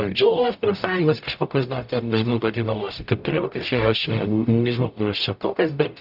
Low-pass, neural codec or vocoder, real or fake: 5.4 kHz; codec, 44.1 kHz, 0.9 kbps, DAC; fake